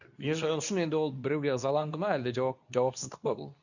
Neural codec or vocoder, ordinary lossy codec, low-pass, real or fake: codec, 24 kHz, 0.9 kbps, WavTokenizer, medium speech release version 2; none; 7.2 kHz; fake